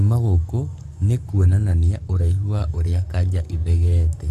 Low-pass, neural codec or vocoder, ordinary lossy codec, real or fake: 14.4 kHz; codec, 44.1 kHz, 7.8 kbps, Pupu-Codec; none; fake